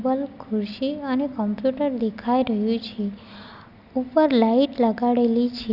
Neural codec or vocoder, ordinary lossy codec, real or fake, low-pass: none; Opus, 64 kbps; real; 5.4 kHz